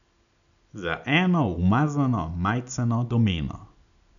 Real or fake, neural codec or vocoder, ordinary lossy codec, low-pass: real; none; none; 7.2 kHz